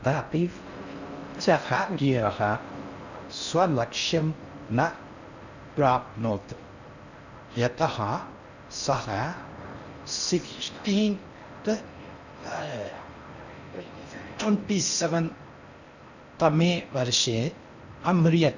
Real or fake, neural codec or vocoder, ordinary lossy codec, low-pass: fake; codec, 16 kHz in and 24 kHz out, 0.6 kbps, FocalCodec, streaming, 4096 codes; none; 7.2 kHz